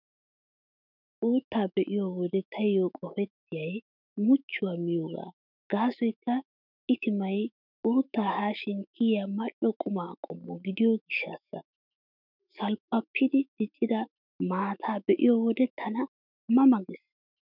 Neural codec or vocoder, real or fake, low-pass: autoencoder, 48 kHz, 128 numbers a frame, DAC-VAE, trained on Japanese speech; fake; 5.4 kHz